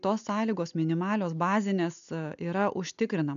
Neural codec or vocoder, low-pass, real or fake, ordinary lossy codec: none; 7.2 kHz; real; AAC, 96 kbps